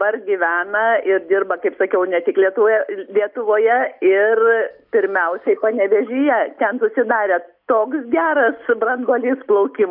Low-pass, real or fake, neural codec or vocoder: 5.4 kHz; real; none